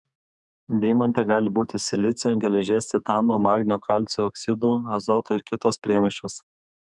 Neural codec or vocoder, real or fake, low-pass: codec, 32 kHz, 1.9 kbps, SNAC; fake; 10.8 kHz